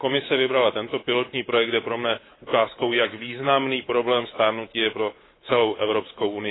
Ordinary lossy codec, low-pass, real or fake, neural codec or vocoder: AAC, 16 kbps; 7.2 kHz; fake; codec, 24 kHz, 3.1 kbps, DualCodec